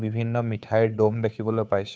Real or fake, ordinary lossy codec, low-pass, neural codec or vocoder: fake; none; none; codec, 16 kHz, 2 kbps, FunCodec, trained on Chinese and English, 25 frames a second